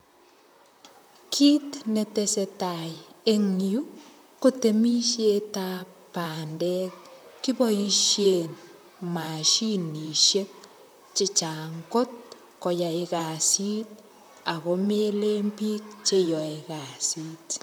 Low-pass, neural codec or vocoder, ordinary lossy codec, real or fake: none; vocoder, 44.1 kHz, 128 mel bands, Pupu-Vocoder; none; fake